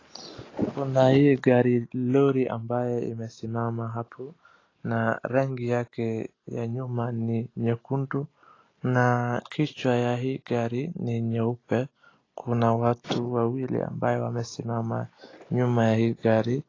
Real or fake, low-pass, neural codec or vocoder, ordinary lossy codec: real; 7.2 kHz; none; AAC, 32 kbps